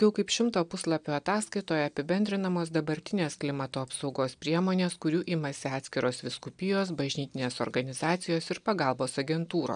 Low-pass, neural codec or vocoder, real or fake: 9.9 kHz; none; real